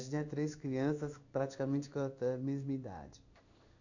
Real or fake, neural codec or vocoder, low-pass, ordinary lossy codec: fake; codec, 16 kHz in and 24 kHz out, 1 kbps, XY-Tokenizer; 7.2 kHz; none